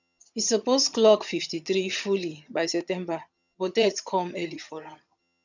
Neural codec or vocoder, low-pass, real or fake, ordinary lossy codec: vocoder, 22.05 kHz, 80 mel bands, HiFi-GAN; 7.2 kHz; fake; none